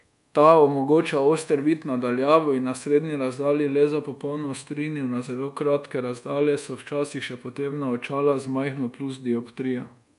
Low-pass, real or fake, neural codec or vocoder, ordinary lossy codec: 10.8 kHz; fake; codec, 24 kHz, 1.2 kbps, DualCodec; none